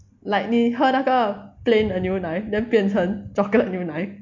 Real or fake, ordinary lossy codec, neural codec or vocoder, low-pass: real; MP3, 48 kbps; none; 7.2 kHz